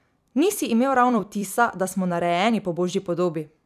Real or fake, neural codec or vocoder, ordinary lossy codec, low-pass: fake; vocoder, 44.1 kHz, 128 mel bands every 256 samples, BigVGAN v2; none; 14.4 kHz